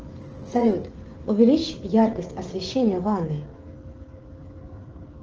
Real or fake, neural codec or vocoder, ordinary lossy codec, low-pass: fake; codec, 16 kHz, 16 kbps, FreqCodec, smaller model; Opus, 16 kbps; 7.2 kHz